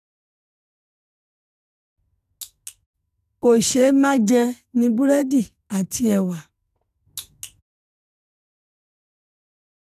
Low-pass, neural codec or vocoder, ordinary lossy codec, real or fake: 14.4 kHz; codec, 44.1 kHz, 2.6 kbps, SNAC; none; fake